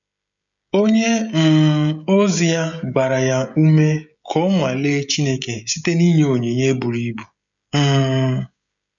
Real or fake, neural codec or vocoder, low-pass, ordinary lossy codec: fake; codec, 16 kHz, 16 kbps, FreqCodec, smaller model; 7.2 kHz; none